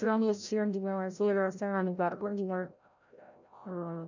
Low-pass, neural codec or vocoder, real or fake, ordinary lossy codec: 7.2 kHz; codec, 16 kHz, 0.5 kbps, FreqCodec, larger model; fake; none